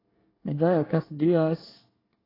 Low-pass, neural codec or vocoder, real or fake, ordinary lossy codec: 5.4 kHz; codec, 24 kHz, 1 kbps, SNAC; fake; AAC, 24 kbps